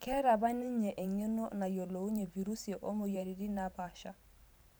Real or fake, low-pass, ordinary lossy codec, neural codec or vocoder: fake; none; none; vocoder, 44.1 kHz, 128 mel bands every 512 samples, BigVGAN v2